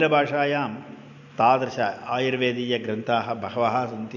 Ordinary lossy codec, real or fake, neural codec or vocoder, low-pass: none; real; none; 7.2 kHz